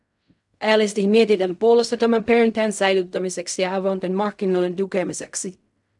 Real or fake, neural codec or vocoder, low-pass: fake; codec, 16 kHz in and 24 kHz out, 0.4 kbps, LongCat-Audio-Codec, fine tuned four codebook decoder; 10.8 kHz